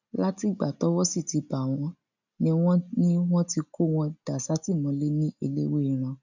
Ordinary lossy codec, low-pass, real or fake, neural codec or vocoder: none; 7.2 kHz; real; none